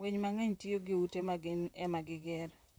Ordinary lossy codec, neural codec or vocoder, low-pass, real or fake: none; vocoder, 44.1 kHz, 128 mel bands, Pupu-Vocoder; none; fake